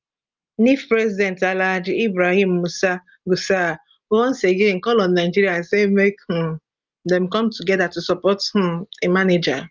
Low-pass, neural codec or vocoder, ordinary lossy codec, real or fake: 7.2 kHz; none; Opus, 24 kbps; real